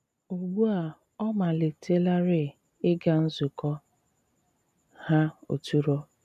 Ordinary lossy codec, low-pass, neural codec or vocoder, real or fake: none; 14.4 kHz; none; real